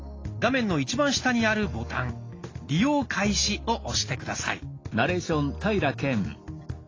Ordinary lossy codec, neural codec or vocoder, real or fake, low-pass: AAC, 32 kbps; none; real; 7.2 kHz